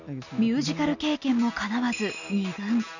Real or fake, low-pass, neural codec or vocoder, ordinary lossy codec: real; 7.2 kHz; none; none